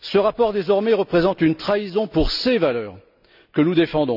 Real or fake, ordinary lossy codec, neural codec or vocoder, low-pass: real; none; none; 5.4 kHz